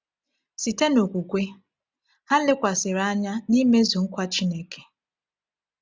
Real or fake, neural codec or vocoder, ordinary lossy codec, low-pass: real; none; none; none